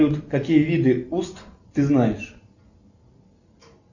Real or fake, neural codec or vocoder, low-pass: real; none; 7.2 kHz